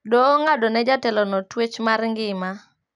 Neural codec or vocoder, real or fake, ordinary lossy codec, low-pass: none; real; none; 10.8 kHz